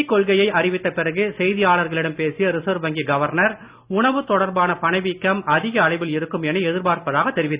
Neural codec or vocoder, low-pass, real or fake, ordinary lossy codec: none; 3.6 kHz; real; Opus, 64 kbps